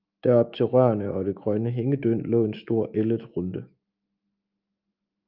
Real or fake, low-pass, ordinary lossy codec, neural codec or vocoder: fake; 5.4 kHz; Opus, 24 kbps; autoencoder, 48 kHz, 128 numbers a frame, DAC-VAE, trained on Japanese speech